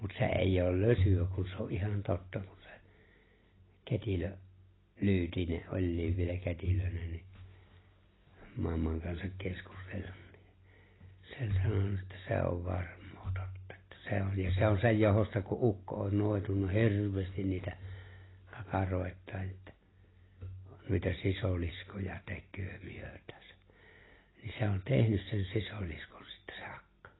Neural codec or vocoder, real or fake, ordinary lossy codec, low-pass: none; real; AAC, 16 kbps; 7.2 kHz